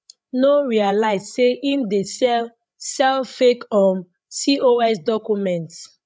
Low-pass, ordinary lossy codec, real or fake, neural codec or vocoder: none; none; fake; codec, 16 kHz, 8 kbps, FreqCodec, larger model